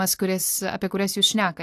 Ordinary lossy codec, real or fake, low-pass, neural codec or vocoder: AAC, 64 kbps; real; 14.4 kHz; none